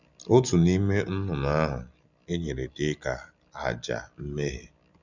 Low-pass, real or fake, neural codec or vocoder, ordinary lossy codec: 7.2 kHz; real; none; none